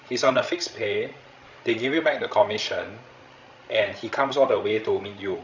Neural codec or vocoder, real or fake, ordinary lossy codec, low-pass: codec, 16 kHz, 16 kbps, FreqCodec, larger model; fake; none; 7.2 kHz